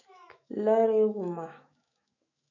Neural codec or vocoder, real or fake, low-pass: autoencoder, 48 kHz, 128 numbers a frame, DAC-VAE, trained on Japanese speech; fake; 7.2 kHz